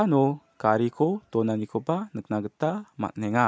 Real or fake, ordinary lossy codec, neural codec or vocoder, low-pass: real; none; none; none